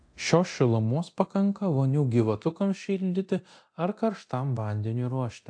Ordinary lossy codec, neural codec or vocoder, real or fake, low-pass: AAC, 64 kbps; codec, 24 kHz, 0.9 kbps, DualCodec; fake; 9.9 kHz